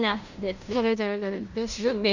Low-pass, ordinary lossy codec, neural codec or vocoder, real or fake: 7.2 kHz; none; codec, 16 kHz in and 24 kHz out, 0.9 kbps, LongCat-Audio-Codec, fine tuned four codebook decoder; fake